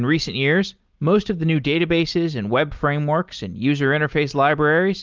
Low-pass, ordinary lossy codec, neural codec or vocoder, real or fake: 7.2 kHz; Opus, 32 kbps; none; real